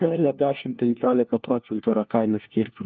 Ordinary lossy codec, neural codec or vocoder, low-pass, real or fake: Opus, 24 kbps; codec, 16 kHz, 1 kbps, FunCodec, trained on LibriTTS, 50 frames a second; 7.2 kHz; fake